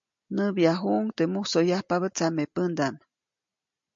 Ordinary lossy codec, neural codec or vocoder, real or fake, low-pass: MP3, 48 kbps; none; real; 7.2 kHz